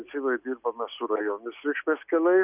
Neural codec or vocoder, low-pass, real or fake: none; 3.6 kHz; real